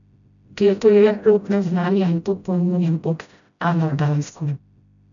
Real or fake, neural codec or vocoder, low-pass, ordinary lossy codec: fake; codec, 16 kHz, 0.5 kbps, FreqCodec, smaller model; 7.2 kHz; none